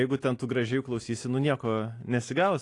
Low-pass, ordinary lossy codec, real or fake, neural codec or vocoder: 10.8 kHz; AAC, 48 kbps; real; none